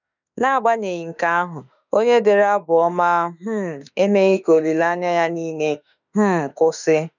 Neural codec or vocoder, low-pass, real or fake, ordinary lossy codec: autoencoder, 48 kHz, 32 numbers a frame, DAC-VAE, trained on Japanese speech; 7.2 kHz; fake; none